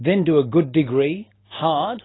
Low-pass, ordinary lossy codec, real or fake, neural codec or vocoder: 7.2 kHz; AAC, 16 kbps; real; none